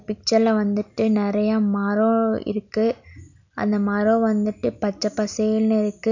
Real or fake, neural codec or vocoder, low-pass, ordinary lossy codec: real; none; 7.2 kHz; none